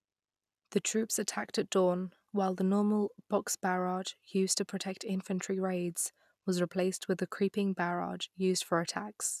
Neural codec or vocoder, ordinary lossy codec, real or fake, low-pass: none; none; real; 14.4 kHz